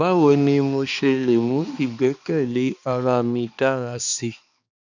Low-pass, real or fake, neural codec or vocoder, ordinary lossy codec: 7.2 kHz; fake; codec, 16 kHz, 2 kbps, X-Codec, HuBERT features, trained on balanced general audio; none